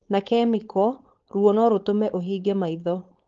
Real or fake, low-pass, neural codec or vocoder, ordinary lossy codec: fake; 7.2 kHz; codec, 16 kHz, 4.8 kbps, FACodec; Opus, 16 kbps